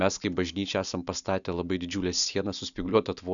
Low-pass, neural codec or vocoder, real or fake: 7.2 kHz; none; real